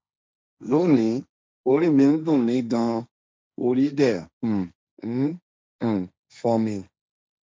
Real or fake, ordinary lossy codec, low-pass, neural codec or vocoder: fake; none; none; codec, 16 kHz, 1.1 kbps, Voila-Tokenizer